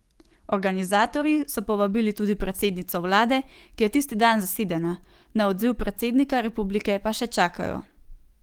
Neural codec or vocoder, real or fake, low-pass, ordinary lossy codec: codec, 44.1 kHz, 7.8 kbps, DAC; fake; 19.8 kHz; Opus, 24 kbps